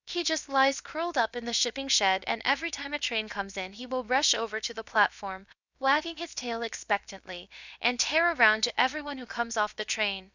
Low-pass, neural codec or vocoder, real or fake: 7.2 kHz; codec, 16 kHz, about 1 kbps, DyCAST, with the encoder's durations; fake